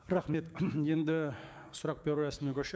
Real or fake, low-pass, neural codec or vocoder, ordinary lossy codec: fake; none; codec, 16 kHz, 6 kbps, DAC; none